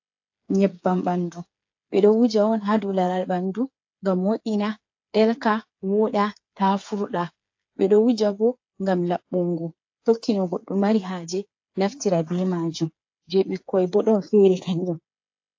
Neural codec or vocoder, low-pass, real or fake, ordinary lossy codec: codec, 16 kHz, 8 kbps, FreqCodec, smaller model; 7.2 kHz; fake; AAC, 48 kbps